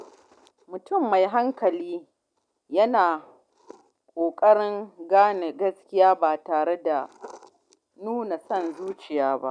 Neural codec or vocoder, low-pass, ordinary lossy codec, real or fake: none; 9.9 kHz; none; real